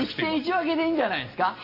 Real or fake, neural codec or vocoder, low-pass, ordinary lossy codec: real; none; 5.4 kHz; Opus, 64 kbps